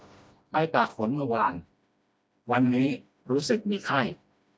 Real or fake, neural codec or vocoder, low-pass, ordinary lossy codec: fake; codec, 16 kHz, 1 kbps, FreqCodec, smaller model; none; none